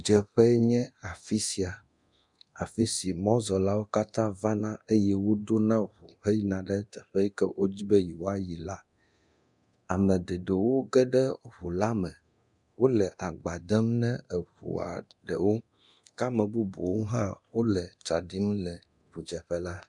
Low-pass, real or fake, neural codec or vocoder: 10.8 kHz; fake; codec, 24 kHz, 0.9 kbps, DualCodec